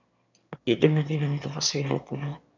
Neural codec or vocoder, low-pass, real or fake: autoencoder, 22.05 kHz, a latent of 192 numbers a frame, VITS, trained on one speaker; 7.2 kHz; fake